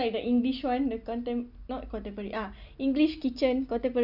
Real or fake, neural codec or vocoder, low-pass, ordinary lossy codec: real; none; 5.4 kHz; none